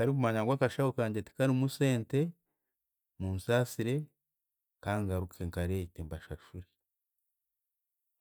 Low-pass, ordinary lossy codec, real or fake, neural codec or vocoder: none; none; real; none